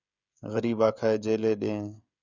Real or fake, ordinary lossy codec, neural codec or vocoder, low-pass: fake; Opus, 64 kbps; codec, 16 kHz, 16 kbps, FreqCodec, smaller model; 7.2 kHz